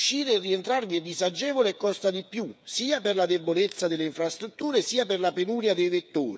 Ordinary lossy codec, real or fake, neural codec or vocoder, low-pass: none; fake; codec, 16 kHz, 8 kbps, FreqCodec, smaller model; none